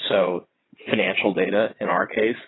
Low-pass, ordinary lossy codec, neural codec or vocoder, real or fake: 7.2 kHz; AAC, 16 kbps; vocoder, 22.05 kHz, 80 mel bands, WaveNeXt; fake